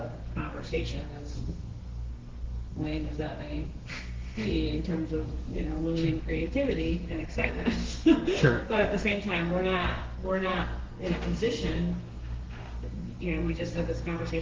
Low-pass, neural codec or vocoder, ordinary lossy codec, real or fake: 7.2 kHz; codec, 32 kHz, 1.9 kbps, SNAC; Opus, 16 kbps; fake